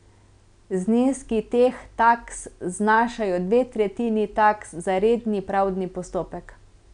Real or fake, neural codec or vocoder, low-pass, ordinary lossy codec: real; none; 9.9 kHz; none